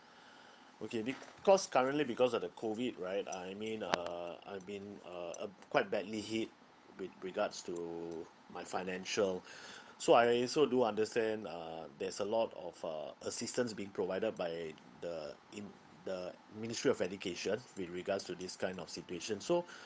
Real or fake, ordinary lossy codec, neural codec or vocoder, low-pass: fake; none; codec, 16 kHz, 8 kbps, FunCodec, trained on Chinese and English, 25 frames a second; none